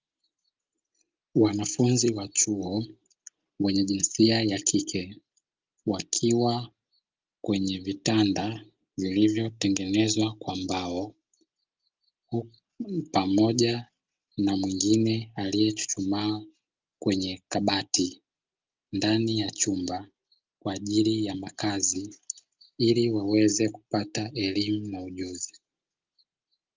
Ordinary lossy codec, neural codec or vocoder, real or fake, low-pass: Opus, 32 kbps; none; real; 7.2 kHz